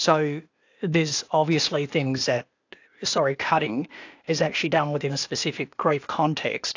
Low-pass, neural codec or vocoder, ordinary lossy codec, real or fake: 7.2 kHz; codec, 16 kHz, 0.8 kbps, ZipCodec; AAC, 48 kbps; fake